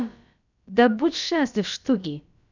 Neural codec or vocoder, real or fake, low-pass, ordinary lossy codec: codec, 16 kHz, about 1 kbps, DyCAST, with the encoder's durations; fake; 7.2 kHz; none